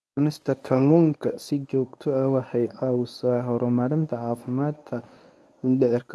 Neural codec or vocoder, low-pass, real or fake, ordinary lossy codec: codec, 24 kHz, 0.9 kbps, WavTokenizer, medium speech release version 1; none; fake; none